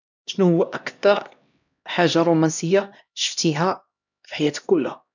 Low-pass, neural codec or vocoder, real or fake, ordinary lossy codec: 7.2 kHz; codec, 16 kHz, 1 kbps, X-Codec, HuBERT features, trained on LibriSpeech; fake; none